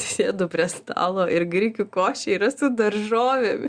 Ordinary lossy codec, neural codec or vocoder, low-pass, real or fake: Opus, 64 kbps; none; 9.9 kHz; real